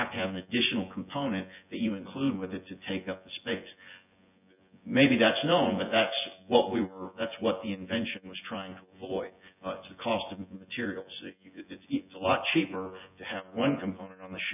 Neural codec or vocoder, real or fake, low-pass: vocoder, 24 kHz, 100 mel bands, Vocos; fake; 3.6 kHz